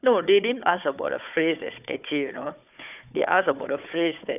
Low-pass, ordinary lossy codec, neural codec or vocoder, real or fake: 3.6 kHz; none; codec, 16 kHz, 4 kbps, FunCodec, trained on Chinese and English, 50 frames a second; fake